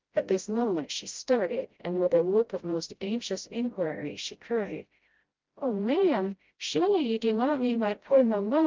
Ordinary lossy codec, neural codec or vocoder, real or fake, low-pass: Opus, 32 kbps; codec, 16 kHz, 0.5 kbps, FreqCodec, smaller model; fake; 7.2 kHz